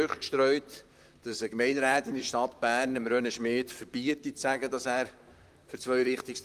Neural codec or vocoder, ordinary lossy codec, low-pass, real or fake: vocoder, 44.1 kHz, 128 mel bands, Pupu-Vocoder; Opus, 32 kbps; 14.4 kHz; fake